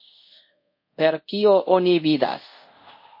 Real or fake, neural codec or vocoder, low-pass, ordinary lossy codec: fake; codec, 24 kHz, 0.5 kbps, DualCodec; 5.4 kHz; MP3, 32 kbps